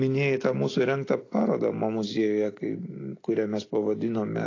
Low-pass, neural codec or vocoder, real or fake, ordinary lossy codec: 7.2 kHz; none; real; AAC, 32 kbps